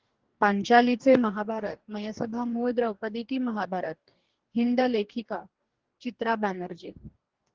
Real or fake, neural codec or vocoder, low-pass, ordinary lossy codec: fake; codec, 44.1 kHz, 2.6 kbps, DAC; 7.2 kHz; Opus, 16 kbps